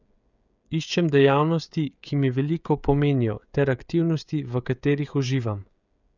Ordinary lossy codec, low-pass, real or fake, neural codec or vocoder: none; 7.2 kHz; fake; codec, 16 kHz, 16 kbps, FreqCodec, smaller model